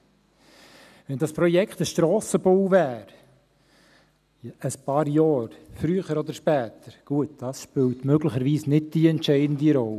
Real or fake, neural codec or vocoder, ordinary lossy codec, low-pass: real; none; none; 14.4 kHz